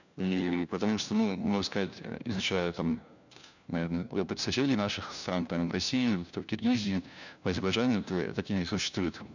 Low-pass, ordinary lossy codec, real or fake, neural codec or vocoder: 7.2 kHz; none; fake; codec, 16 kHz, 1 kbps, FunCodec, trained on LibriTTS, 50 frames a second